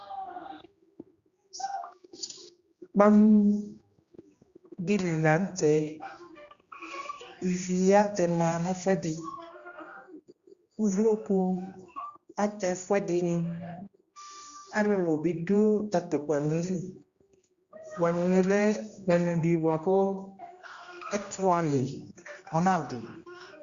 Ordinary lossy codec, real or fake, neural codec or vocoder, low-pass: Opus, 64 kbps; fake; codec, 16 kHz, 1 kbps, X-Codec, HuBERT features, trained on general audio; 7.2 kHz